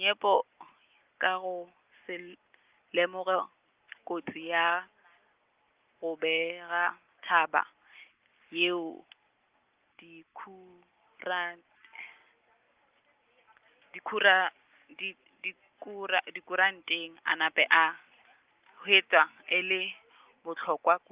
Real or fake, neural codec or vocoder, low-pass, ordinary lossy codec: real; none; 3.6 kHz; Opus, 32 kbps